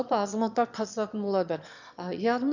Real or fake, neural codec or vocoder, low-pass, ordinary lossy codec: fake; autoencoder, 22.05 kHz, a latent of 192 numbers a frame, VITS, trained on one speaker; 7.2 kHz; none